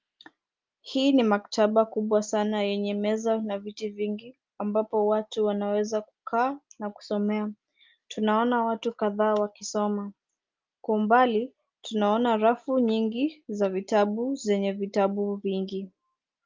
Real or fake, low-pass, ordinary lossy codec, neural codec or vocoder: real; 7.2 kHz; Opus, 24 kbps; none